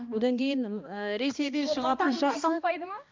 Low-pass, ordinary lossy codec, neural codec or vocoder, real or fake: 7.2 kHz; MP3, 64 kbps; codec, 16 kHz, 1 kbps, X-Codec, HuBERT features, trained on balanced general audio; fake